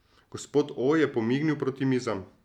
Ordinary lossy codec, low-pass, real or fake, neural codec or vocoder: none; 19.8 kHz; real; none